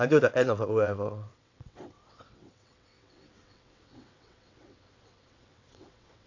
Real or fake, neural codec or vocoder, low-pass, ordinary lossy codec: fake; vocoder, 22.05 kHz, 80 mel bands, WaveNeXt; 7.2 kHz; AAC, 48 kbps